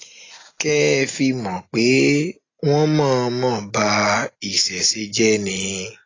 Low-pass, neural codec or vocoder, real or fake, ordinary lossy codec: 7.2 kHz; none; real; AAC, 32 kbps